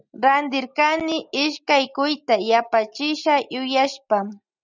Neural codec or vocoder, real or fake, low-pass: none; real; 7.2 kHz